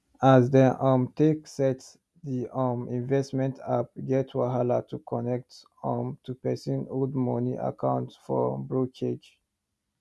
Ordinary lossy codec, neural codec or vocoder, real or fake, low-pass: none; none; real; none